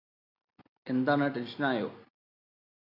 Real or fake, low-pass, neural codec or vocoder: real; 5.4 kHz; none